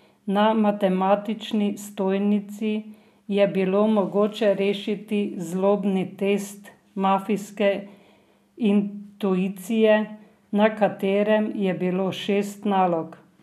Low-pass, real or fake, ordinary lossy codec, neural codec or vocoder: 14.4 kHz; real; none; none